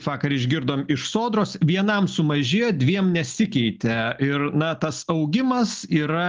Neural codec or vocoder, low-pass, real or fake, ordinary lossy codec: none; 7.2 kHz; real; Opus, 32 kbps